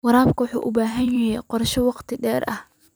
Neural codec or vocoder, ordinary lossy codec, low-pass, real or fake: vocoder, 44.1 kHz, 128 mel bands every 256 samples, BigVGAN v2; none; none; fake